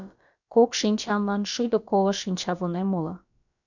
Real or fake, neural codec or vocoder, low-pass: fake; codec, 16 kHz, about 1 kbps, DyCAST, with the encoder's durations; 7.2 kHz